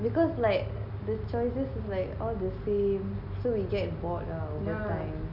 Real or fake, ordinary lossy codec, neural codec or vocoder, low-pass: real; none; none; 5.4 kHz